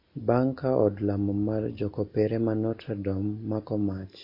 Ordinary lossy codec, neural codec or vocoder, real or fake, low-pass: MP3, 32 kbps; none; real; 5.4 kHz